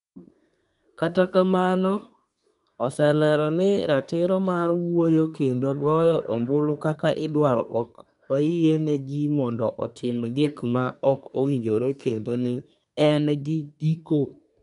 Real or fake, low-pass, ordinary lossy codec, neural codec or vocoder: fake; 10.8 kHz; none; codec, 24 kHz, 1 kbps, SNAC